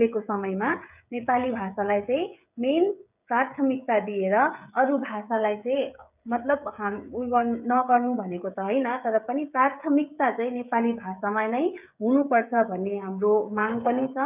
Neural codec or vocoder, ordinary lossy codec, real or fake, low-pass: codec, 16 kHz, 16 kbps, FreqCodec, smaller model; none; fake; 3.6 kHz